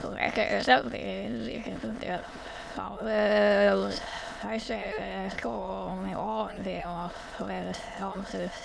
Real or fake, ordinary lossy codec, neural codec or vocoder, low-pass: fake; none; autoencoder, 22.05 kHz, a latent of 192 numbers a frame, VITS, trained on many speakers; none